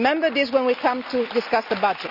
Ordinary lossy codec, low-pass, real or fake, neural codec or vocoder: none; 5.4 kHz; real; none